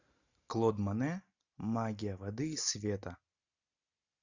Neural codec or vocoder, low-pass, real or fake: none; 7.2 kHz; real